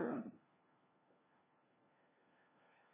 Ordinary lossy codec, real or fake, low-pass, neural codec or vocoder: MP3, 16 kbps; fake; 3.6 kHz; codec, 16 kHz, 1 kbps, FunCodec, trained on LibriTTS, 50 frames a second